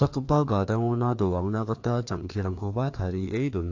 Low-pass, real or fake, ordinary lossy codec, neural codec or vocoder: 7.2 kHz; fake; AAC, 48 kbps; codec, 16 kHz, 2 kbps, FreqCodec, larger model